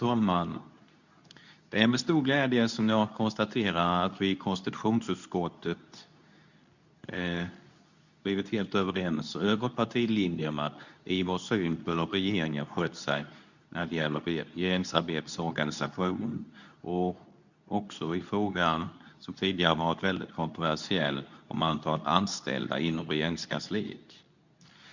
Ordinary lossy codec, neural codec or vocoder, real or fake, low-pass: none; codec, 24 kHz, 0.9 kbps, WavTokenizer, medium speech release version 2; fake; 7.2 kHz